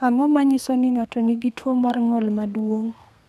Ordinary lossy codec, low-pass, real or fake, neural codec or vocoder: none; 14.4 kHz; fake; codec, 32 kHz, 1.9 kbps, SNAC